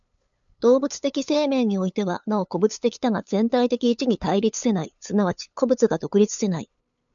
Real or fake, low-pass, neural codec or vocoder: fake; 7.2 kHz; codec, 16 kHz, 8 kbps, FunCodec, trained on LibriTTS, 25 frames a second